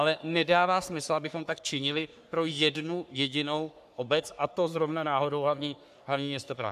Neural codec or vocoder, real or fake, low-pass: codec, 44.1 kHz, 3.4 kbps, Pupu-Codec; fake; 14.4 kHz